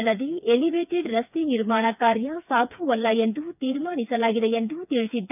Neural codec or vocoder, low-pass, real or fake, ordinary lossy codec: codec, 16 kHz, 4 kbps, FreqCodec, smaller model; 3.6 kHz; fake; none